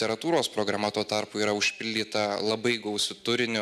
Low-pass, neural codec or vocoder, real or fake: 14.4 kHz; none; real